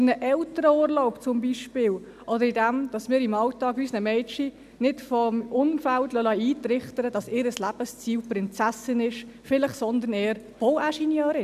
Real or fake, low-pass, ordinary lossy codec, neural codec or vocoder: real; 14.4 kHz; none; none